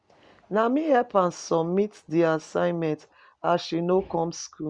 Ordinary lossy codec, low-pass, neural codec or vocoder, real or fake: Opus, 64 kbps; 9.9 kHz; none; real